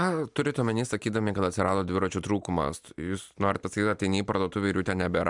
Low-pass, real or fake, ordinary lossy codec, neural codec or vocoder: 10.8 kHz; real; MP3, 96 kbps; none